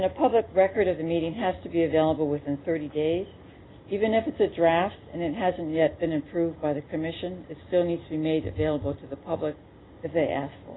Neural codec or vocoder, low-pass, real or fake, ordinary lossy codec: none; 7.2 kHz; real; AAC, 16 kbps